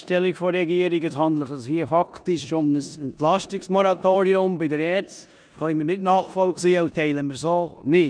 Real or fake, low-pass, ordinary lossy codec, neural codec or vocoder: fake; 9.9 kHz; none; codec, 16 kHz in and 24 kHz out, 0.9 kbps, LongCat-Audio-Codec, four codebook decoder